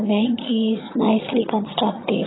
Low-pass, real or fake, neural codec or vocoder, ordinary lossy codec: 7.2 kHz; fake; vocoder, 22.05 kHz, 80 mel bands, HiFi-GAN; AAC, 16 kbps